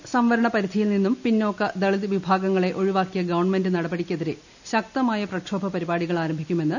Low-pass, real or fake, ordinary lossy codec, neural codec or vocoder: 7.2 kHz; real; none; none